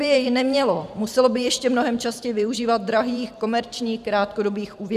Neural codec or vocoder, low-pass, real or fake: vocoder, 44.1 kHz, 128 mel bands every 512 samples, BigVGAN v2; 14.4 kHz; fake